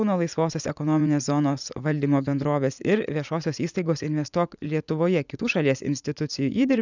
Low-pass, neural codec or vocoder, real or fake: 7.2 kHz; vocoder, 44.1 kHz, 80 mel bands, Vocos; fake